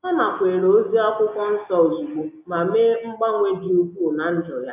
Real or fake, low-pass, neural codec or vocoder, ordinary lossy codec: real; 3.6 kHz; none; MP3, 32 kbps